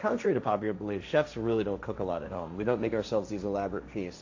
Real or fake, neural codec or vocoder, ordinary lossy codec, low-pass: fake; codec, 16 kHz, 1.1 kbps, Voila-Tokenizer; AAC, 48 kbps; 7.2 kHz